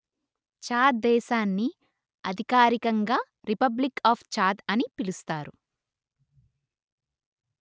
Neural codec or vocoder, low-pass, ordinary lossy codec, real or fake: none; none; none; real